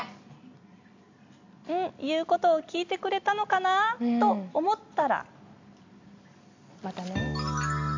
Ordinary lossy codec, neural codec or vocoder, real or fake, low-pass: AAC, 48 kbps; none; real; 7.2 kHz